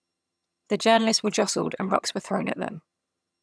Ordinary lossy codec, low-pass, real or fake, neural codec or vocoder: none; none; fake; vocoder, 22.05 kHz, 80 mel bands, HiFi-GAN